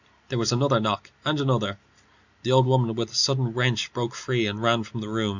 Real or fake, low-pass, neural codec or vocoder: real; 7.2 kHz; none